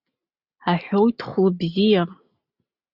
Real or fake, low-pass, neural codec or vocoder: real; 5.4 kHz; none